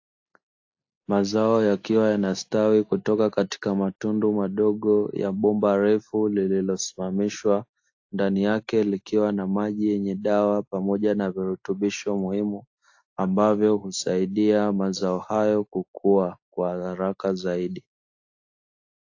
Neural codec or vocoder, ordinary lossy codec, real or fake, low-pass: none; AAC, 48 kbps; real; 7.2 kHz